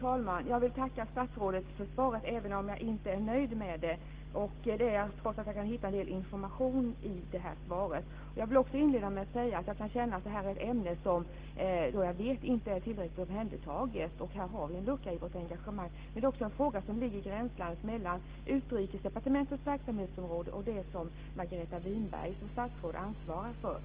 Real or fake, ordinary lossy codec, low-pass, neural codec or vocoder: real; Opus, 16 kbps; 3.6 kHz; none